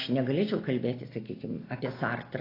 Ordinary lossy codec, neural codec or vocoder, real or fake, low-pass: AAC, 24 kbps; none; real; 5.4 kHz